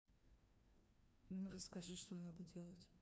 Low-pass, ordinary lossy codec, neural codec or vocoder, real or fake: none; none; codec, 16 kHz, 1 kbps, FreqCodec, larger model; fake